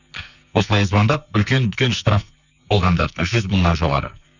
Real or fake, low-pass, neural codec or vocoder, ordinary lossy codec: fake; 7.2 kHz; codec, 44.1 kHz, 2.6 kbps, SNAC; none